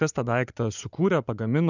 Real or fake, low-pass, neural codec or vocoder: fake; 7.2 kHz; vocoder, 44.1 kHz, 80 mel bands, Vocos